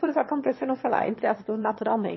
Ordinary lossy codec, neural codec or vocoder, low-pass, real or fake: MP3, 24 kbps; autoencoder, 22.05 kHz, a latent of 192 numbers a frame, VITS, trained on one speaker; 7.2 kHz; fake